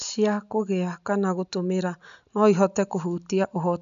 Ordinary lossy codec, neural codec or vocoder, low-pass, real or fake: none; none; 7.2 kHz; real